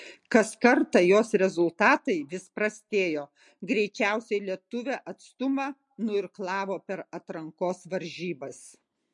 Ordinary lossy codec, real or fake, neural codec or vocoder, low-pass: MP3, 48 kbps; real; none; 10.8 kHz